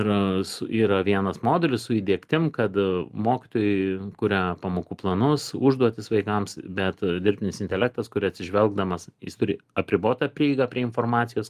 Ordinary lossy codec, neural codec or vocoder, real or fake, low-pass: Opus, 32 kbps; none; real; 14.4 kHz